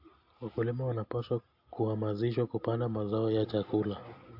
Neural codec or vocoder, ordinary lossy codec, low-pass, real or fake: none; AAC, 48 kbps; 5.4 kHz; real